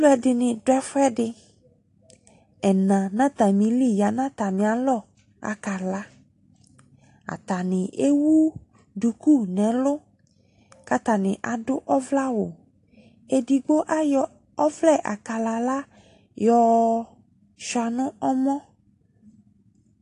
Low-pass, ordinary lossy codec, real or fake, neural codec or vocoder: 10.8 kHz; AAC, 48 kbps; real; none